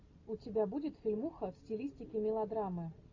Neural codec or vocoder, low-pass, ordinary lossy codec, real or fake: none; 7.2 kHz; AAC, 48 kbps; real